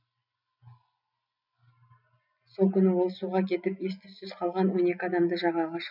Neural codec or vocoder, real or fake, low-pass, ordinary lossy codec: none; real; 5.4 kHz; none